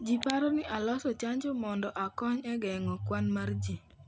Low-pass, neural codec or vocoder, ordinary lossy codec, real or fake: none; none; none; real